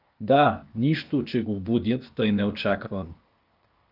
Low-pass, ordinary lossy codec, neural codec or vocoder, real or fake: 5.4 kHz; Opus, 24 kbps; codec, 16 kHz, 0.8 kbps, ZipCodec; fake